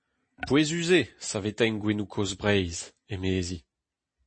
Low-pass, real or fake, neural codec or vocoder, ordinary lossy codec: 9.9 kHz; real; none; MP3, 32 kbps